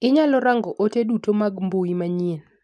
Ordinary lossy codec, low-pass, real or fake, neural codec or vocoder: none; none; real; none